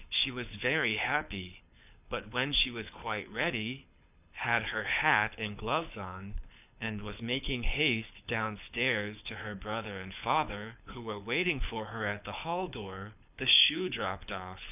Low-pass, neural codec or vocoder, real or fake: 3.6 kHz; codec, 24 kHz, 6 kbps, HILCodec; fake